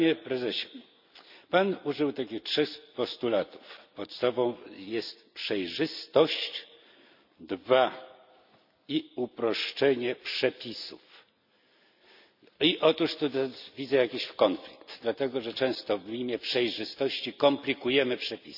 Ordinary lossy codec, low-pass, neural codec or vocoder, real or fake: none; 5.4 kHz; none; real